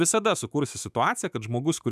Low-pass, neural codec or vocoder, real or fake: 14.4 kHz; autoencoder, 48 kHz, 128 numbers a frame, DAC-VAE, trained on Japanese speech; fake